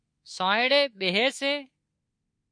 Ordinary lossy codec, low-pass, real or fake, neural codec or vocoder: MP3, 48 kbps; 9.9 kHz; fake; codec, 24 kHz, 3.1 kbps, DualCodec